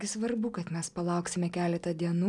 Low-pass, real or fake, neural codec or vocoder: 10.8 kHz; real; none